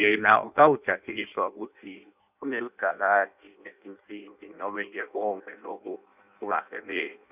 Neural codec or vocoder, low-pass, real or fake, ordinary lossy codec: codec, 16 kHz in and 24 kHz out, 0.6 kbps, FireRedTTS-2 codec; 3.6 kHz; fake; none